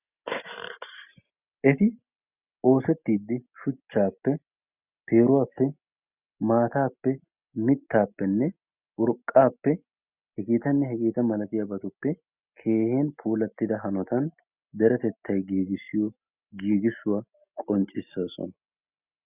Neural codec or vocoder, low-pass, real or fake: none; 3.6 kHz; real